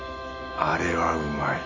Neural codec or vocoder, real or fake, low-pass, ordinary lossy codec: none; real; 7.2 kHz; MP3, 32 kbps